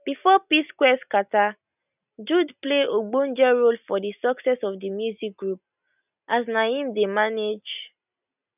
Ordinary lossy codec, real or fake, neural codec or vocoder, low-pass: none; real; none; 3.6 kHz